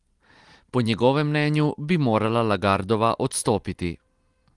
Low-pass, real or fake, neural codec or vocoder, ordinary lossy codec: 10.8 kHz; real; none; Opus, 24 kbps